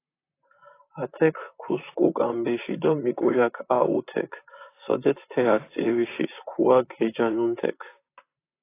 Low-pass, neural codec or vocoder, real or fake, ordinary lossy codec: 3.6 kHz; vocoder, 44.1 kHz, 128 mel bands, Pupu-Vocoder; fake; AAC, 24 kbps